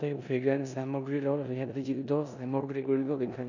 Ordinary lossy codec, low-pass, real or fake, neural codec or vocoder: none; 7.2 kHz; fake; codec, 16 kHz in and 24 kHz out, 0.9 kbps, LongCat-Audio-Codec, four codebook decoder